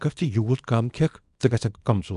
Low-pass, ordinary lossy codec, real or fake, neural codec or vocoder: 10.8 kHz; none; fake; codec, 24 kHz, 0.9 kbps, WavTokenizer, small release